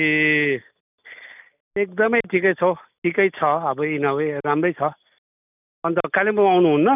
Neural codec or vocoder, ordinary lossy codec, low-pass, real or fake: none; none; 3.6 kHz; real